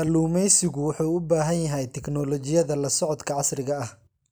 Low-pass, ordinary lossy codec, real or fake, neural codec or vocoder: none; none; real; none